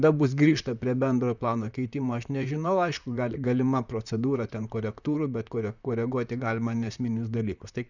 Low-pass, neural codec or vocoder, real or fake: 7.2 kHz; vocoder, 44.1 kHz, 128 mel bands, Pupu-Vocoder; fake